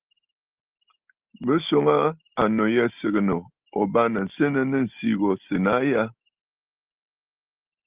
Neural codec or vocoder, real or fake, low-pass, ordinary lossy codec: none; real; 3.6 kHz; Opus, 24 kbps